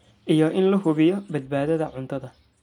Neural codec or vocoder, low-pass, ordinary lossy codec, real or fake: none; 19.8 kHz; none; real